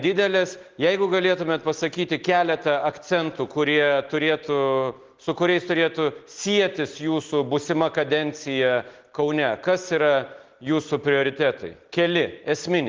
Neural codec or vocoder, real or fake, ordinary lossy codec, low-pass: none; real; Opus, 16 kbps; 7.2 kHz